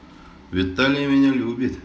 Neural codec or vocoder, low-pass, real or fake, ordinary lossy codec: none; none; real; none